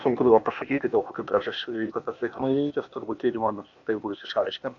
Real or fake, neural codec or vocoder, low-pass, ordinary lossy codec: fake; codec, 16 kHz, 0.8 kbps, ZipCodec; 7.2 kHz; AAC, 64 kbps